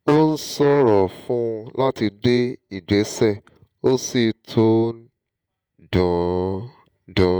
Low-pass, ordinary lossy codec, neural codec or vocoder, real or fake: none; none; vocoder, 48 kHz, 128 mel bands, Vocos; fake